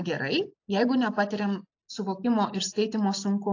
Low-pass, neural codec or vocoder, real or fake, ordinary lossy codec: 7.2 kHz; none; real; AAC, 48 kbps